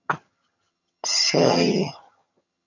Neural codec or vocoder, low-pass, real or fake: vocoder, 22.05 kHz, 80 mel bands, HiFi-GAN; 7.2 kHz; fake